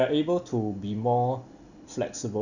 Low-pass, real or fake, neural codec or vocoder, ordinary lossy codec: 7.2 kHz; real; none; none